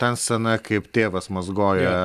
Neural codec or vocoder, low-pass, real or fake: none; 14.4 kHz; real